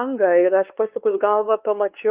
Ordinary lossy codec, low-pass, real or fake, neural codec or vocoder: Opus, 32 kbps; 3.6 kHz; fake; codec, 16 kHz, 4 kbps, X-Codec, WavLM features, trained on Multilingual LibriSpeech